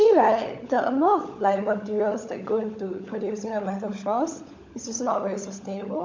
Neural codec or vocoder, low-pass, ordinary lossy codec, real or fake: codec, 16 kHz, 16 kbps, FunCodec, trained on LibriTTS, 50 frames a second; 7.2 kHz; MP3, 64 kbps; fake